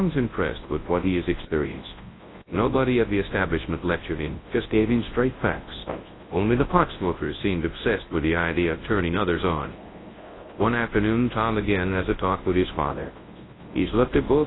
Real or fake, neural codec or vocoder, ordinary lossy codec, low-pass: fake; codec, 24 kHz, 0.9 kbps, WavTokenizer, large speech release; AAC, 16 kbps; 7.2 kHz